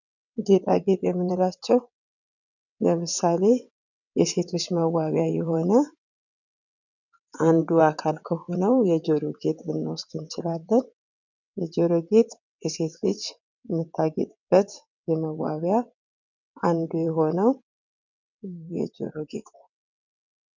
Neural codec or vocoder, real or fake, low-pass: vocoder, 22.05 kHz, 80 mel bands, WaveNeXt; fake; 7.2 kHz